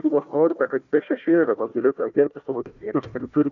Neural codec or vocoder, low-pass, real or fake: codec, 16 kHz, 1 kbps, FunCodec, trained on Chinese and English, 50 frames a second; 7.2 kHz; fake